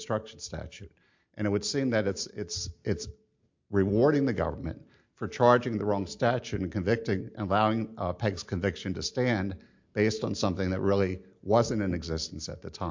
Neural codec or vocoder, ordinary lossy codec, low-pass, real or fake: none; MP3, 48 kbps; 7.2 kHz; real